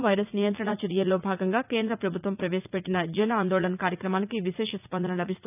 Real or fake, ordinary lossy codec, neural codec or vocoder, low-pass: fake; none; vocoder, 44.1 kHz, 80 mel bands, Vocos; 3.6 kHz